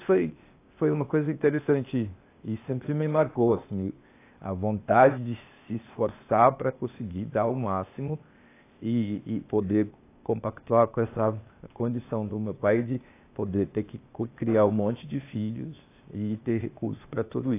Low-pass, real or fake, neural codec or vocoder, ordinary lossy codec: 3.6 kHz; fake; codec, 16 kHz, 0.8 kbps, ZipCodec; AAC, 24 kbps